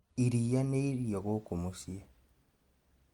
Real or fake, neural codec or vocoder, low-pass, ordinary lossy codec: real; none; 19.8 kHz; Opus, 32 kbps